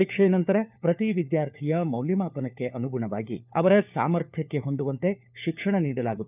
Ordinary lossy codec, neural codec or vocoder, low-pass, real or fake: none; codec, 16 kHz, 4 kbps, FunCodec, trained on LibriTTS, 50 frames a second; 3.6 kHz; fake